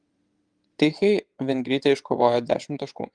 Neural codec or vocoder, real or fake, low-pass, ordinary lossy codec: vocoder, 22.05 kHz, 80 mel bands, WaveNeXt; fake; 9.9 kHz; Opus, 24 kbps